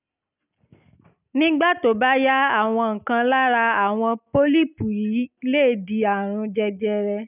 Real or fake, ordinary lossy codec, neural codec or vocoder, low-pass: real; none; none; 3.6 kHz